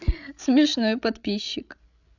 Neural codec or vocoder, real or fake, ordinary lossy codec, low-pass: codec, 16 kHz, 16 kbps, FreqCodec, larger model; fake; none; 7.2 kHz